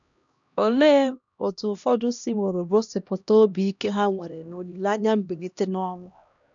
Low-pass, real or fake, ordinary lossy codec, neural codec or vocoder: 7.2 kHz; fake; none; codec, 16 kHz, 1 kbps, X-Codec, HuBERT features, trained on LibriSpeech